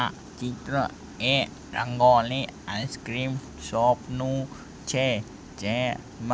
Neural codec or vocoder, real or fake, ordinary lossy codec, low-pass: none; real; none; none